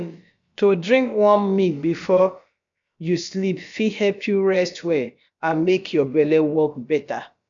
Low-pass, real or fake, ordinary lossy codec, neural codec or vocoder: 7.2 kHz; fake; MP3, 64 kbps; codec, 16 kHz, about 1 kbps, DyCAST, with the encoder's durations